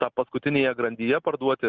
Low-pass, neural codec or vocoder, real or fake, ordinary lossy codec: 7.2 kHz; none; real; Opus, 24 kbps